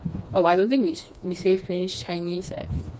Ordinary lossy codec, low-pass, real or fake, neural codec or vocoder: none; none; fake; codec, 16 kHz, 2 kbps, FreqCodec, smaller model